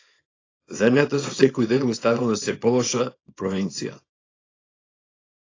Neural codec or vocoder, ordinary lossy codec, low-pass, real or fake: codec, 24 kHz, 0.9 kbps, WavTokenizer, small release; AAC, 32 kbps; 7.2 kHz; fake